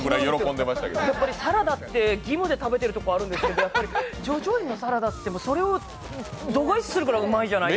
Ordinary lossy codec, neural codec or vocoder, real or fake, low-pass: none; none; real; none